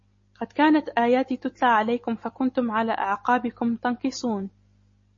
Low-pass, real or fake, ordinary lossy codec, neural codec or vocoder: 7.2 kHz; real; MP3, 32 kbps; none